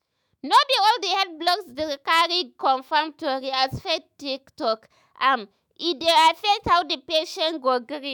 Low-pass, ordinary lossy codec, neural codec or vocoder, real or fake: none; none; autoencoder, 48 kHz, 128 numbers a frame, DAC-VAE, trained on Japanese speech; fake